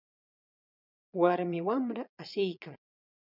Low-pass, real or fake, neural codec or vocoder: 5.4 kHz; fake; vocoder, 22.05 kHz, 80 mel bands, Vocos